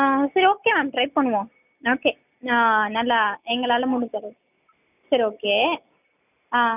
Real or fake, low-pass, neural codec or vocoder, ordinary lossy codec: real; 3.6 kHz; none; none